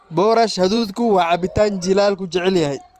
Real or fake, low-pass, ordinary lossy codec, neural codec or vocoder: fake; 14.4 kHz; Opus, 24 kbps; vocoder, 44.1 kHz, 128 mel bands every 512 samples, BigVGAN v2